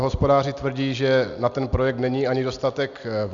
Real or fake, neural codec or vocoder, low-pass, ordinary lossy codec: real; none; 7.2 kHz; Opus, 64 kbps